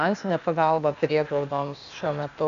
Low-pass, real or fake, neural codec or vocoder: 7.2 kHz; fake; codec, 16 kHz, 0.8 kbps, ZipCodec